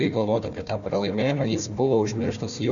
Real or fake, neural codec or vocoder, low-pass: fake; codec, 16 kHz, 1 kbps, FunCodec, trained on Chinese and English, 50 frames a second; 7.2 kHz